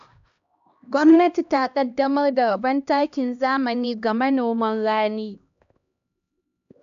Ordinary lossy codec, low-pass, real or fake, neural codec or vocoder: AAC, 96 kbps; 7.2 kHz; fake; codec, 16 kHz, 1 kbps, X-Codec, HuBERT features, trained on LibriSpeech